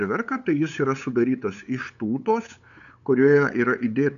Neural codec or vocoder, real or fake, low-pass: codec, 16 kHz, 8 kbps, FunCodec, trained on LibriTTS, 25 frames a second; fake; 7.2 kHz